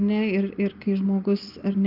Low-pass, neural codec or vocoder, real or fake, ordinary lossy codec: 5.4 kHz; none; real; Opus, 24 kbps